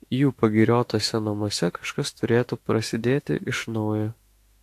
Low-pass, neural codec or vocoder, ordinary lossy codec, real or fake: 14.4 kHz; autoencoder, 48 kHz, 32 numbers a frame, DAC-VAE, trained on Japanese speech; AAC, 64 kbps; fake